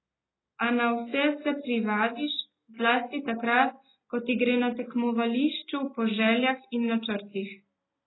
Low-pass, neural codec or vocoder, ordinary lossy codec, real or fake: 7.2 kHz; none; AAC, 16 kbps; real